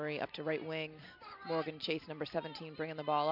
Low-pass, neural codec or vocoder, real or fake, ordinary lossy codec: 5.4 kHz; none; real; MP3, 48 kbps